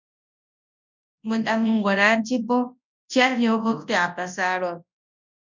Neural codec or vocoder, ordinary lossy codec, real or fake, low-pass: codec, 24 kHz, 0.9 kbps, WavTokenizer, large speech release; MP3, 64 kbps; fake; 7.2 kHz